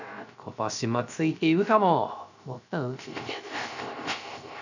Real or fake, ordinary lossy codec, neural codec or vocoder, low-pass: fake; none; codec, 16 kHz, 0.3 kbps, FocalCodec; 7.2 kHz